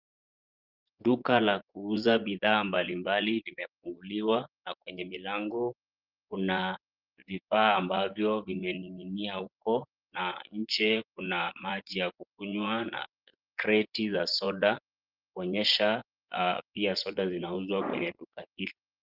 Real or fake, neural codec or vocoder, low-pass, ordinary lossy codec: fake; vocoder, 24 kHz, 100 mel bands, Vocos; 5.4 kHz; Opus, 32 kbps